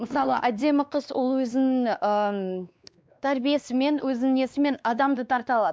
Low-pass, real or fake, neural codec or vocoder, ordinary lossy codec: none; fake; codec, 16 kHz, 2 kbps, X-Codec, WavLM features, trained on Multilingual LibriSpeech; none